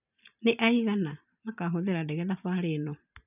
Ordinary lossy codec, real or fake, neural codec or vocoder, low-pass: none; fake; vocoder, 44.1 kHz, 128 mel bands every 512 samples, BigVGAN v2; 3.6 kHz